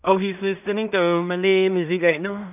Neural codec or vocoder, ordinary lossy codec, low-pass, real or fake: codec, 16 kHz in and 24 kHz out, 0.4 kbps, LongCat-Audio-Codec, two codebook decoder; none; 3.6 kHz; fake